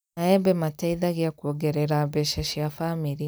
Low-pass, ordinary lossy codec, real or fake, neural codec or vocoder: none; none; real; none